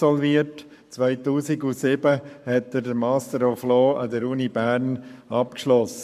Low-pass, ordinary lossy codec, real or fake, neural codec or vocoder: 14.4 kHz; none; fake; codec, 44.1 kHz, 7.8 kbps, Pupu-Codec